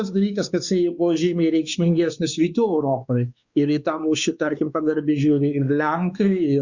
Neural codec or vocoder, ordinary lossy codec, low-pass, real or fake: codec, 16 kHz, 2 kbps, X-Codec, WavLM features, trained on Multilingual LibriSpeech; Opus, 64 kbps; 7.2 kHz; fake